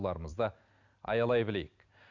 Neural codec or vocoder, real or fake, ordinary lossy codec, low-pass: none; real; none; 7.2 kHz